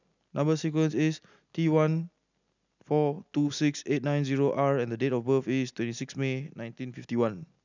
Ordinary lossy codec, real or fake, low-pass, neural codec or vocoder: none; real; 7.2 kHz; none